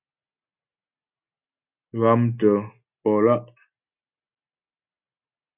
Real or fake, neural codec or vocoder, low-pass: real; none; 3.6 kHz